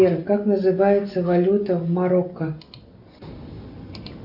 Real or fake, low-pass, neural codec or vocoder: real; 5.4 kHz; none